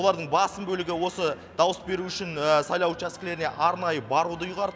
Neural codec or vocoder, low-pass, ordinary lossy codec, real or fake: none; none; none; real